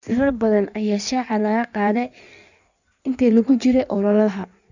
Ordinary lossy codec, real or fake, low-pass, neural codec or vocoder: none; fake; 7.2 kHz; codec, 16 kHz in and 24 kHz out, 1.1 kbps, FireRedTTS-2 codec